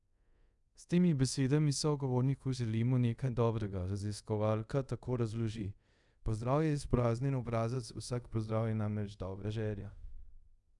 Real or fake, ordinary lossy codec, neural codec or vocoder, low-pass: fake; none; codec, 24 kHz, 0.5 kbps, DualCodec; 10.8 kHz